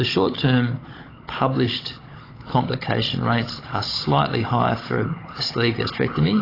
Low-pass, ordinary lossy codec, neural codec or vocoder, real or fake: 5.4 kHz; AAC, 24 kbps; codec, 16 kHz, 16 kbps, FunCodec, trained on Chinese and English, 50 frames a second; fake